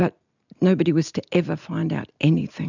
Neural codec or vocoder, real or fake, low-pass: none; real; 7.2 kHz